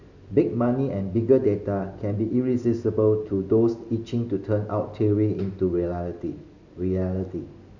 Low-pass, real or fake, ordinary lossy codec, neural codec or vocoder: 7.2 kHz; real; none; none